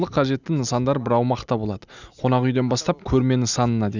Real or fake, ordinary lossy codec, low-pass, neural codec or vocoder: real; none; 7.2 kHz; none